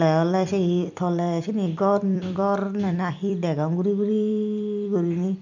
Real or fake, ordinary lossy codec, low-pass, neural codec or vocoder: fake; none; 7.2 kHz; vocoder, 44.1 kHz, 128 mel bands every 256 samples, BigVGAN v2